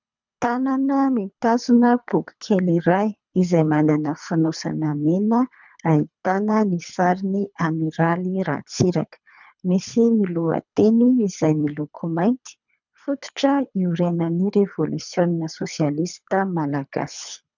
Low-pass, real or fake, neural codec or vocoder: 7.2 kHz; fake; codec, 24 kHz, 3 kbps, HILCodec